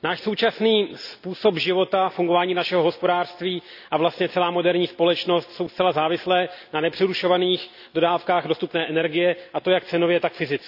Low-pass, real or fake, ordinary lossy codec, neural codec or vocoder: 5.4 kHz; real; none; none